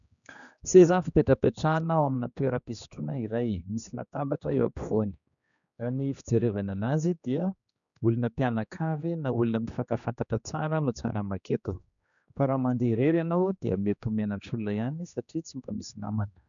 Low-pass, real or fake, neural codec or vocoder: 7.2 kHz; fake; codec, 16 kHz, 2 kbps, X-Codec, HuBERT features, trained on general audio